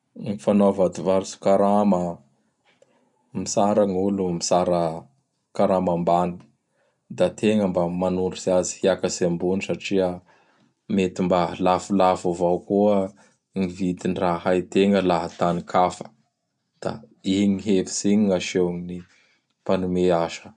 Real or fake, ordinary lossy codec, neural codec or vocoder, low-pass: real; none; none; 10.8 kHz